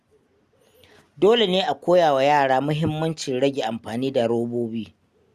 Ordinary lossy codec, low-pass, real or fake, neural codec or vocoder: Opus, 64 kbps; 14.4 kHz; real; none